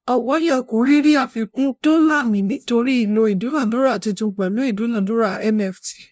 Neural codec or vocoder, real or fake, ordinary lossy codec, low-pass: codec, 16 kHz, 0.5 kbps, FunCodec, trained on LibriTTS, 25 frames a second; fake; none; none